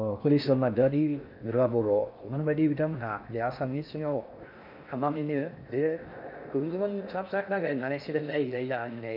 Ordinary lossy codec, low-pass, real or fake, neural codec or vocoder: none; 5.4 kHz; fake; codec, 16 kHz in and 24 kHz out, 0.6 kbps, FocalCodec, streaming, 4096 codes